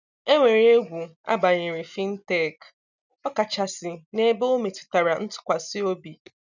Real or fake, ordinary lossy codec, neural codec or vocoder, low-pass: real; none; none; 7.2 kHz